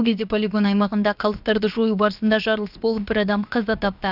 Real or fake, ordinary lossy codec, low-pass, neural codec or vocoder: fake; none; 5.4 kHz; codec, 16 kHz, about 1 kbps, DyCAST, with the encoder's durations